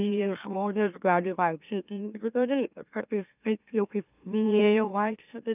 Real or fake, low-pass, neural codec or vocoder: fake; 3.6 kHz; autoencoder, 44.1 kHz, a latent of 192 numbers a frame, MeloTTS